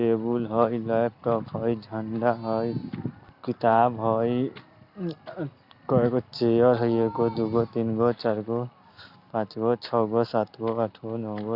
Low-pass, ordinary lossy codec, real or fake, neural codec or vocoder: 5.4 kHz; Opus, 64 kbps; real; none